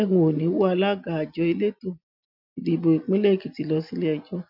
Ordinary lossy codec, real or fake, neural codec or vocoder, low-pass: none; real; none; 5.4 kHz